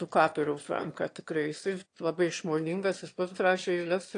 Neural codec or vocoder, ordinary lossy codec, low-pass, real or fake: autoencoder, 22.05 kHz, a latent of 192 numbers a frame, VITS, trained on one speaker; AAC, 48 kbps; 9.9 kHz; fake